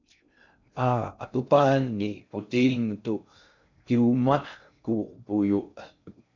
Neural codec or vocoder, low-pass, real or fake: codec, 16 kHz in and 24 kHz out, 0.6 kbps, FocalCodec, streaming, 2048 codes; 7.2 kHz; fake